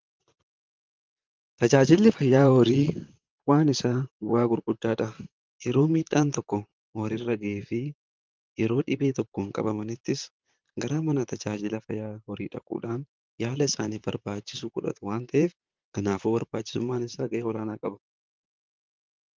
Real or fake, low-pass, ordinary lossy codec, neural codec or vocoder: fake; 7.2 kHz; Opus, 32 kbps; vocoder, 44.1 kHz, 128 mel bands, Pupu-Vocoder